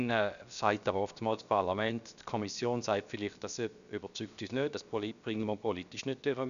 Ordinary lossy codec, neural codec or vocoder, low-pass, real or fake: none; codec, 16 kHz, 0.7 kbps, FocalCodec; 7.2 kHz; fake